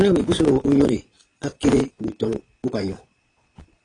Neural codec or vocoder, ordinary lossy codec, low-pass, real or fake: vocoder, 22.05 kHz, 80 mel bands, Vocos; AAC, 32 kbps; 9.9 kHz; fake